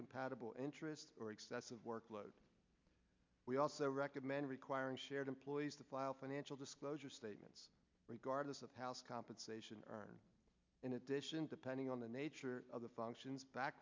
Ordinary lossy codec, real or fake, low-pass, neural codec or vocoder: MP3, 64 kbps; real; 7.2 kHz; none